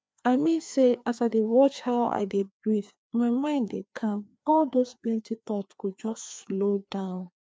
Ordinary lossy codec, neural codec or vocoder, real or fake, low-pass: none; codec, 16 kHz, 2 kbps, FreqCodec, larger model; fake; none